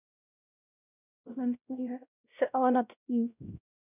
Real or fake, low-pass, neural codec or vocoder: fake; 3.6 kHz; codec, 16 kHz, 0.5 kbps, X-Codec, WavLM features, trained on Multilingual LibriSpeech